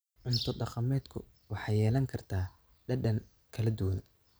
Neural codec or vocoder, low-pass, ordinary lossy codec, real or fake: none; none; none; real